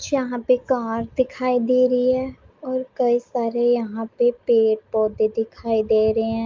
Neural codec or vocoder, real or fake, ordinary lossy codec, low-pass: none; real; Opus, 24 kbps; 7.2 kHz